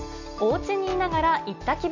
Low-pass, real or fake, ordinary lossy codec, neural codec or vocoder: 7.2 kHz; real; none; none